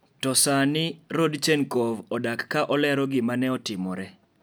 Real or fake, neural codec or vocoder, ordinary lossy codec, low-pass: real; none; none; none